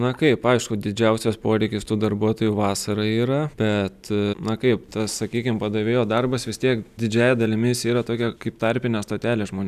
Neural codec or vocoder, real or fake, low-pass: none; real; 14.4 kHz